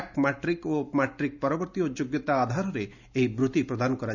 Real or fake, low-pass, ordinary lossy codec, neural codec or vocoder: real; 7.2 kHz; none; none